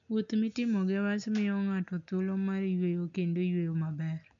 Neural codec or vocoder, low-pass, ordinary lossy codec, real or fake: none; 7.2 kHz; none; real